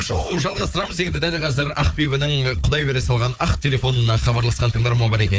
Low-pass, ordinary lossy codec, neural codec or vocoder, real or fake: none; none; codec, 16 kHz, 4 kbps, FunCodec, trained on Chinese and English, 50 frames a second; fake